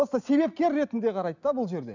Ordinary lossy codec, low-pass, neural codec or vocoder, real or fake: none; 7.2 kHz; none; real